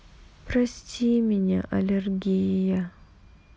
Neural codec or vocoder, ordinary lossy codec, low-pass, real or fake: none; none; none; real